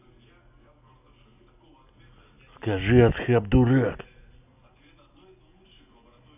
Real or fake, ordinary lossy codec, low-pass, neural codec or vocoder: real; none; 3.6 kHz; none